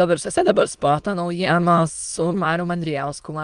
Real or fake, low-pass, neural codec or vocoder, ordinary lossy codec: fake; 9.9 kHz; autoencoder, 22.05 kHz, a latent of 192 numbers a frame, VITS, trained on many speakers; Opus, 32 kbps